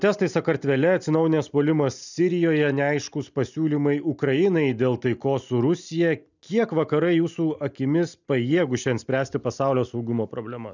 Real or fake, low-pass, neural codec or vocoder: real; 7.2 kHz; none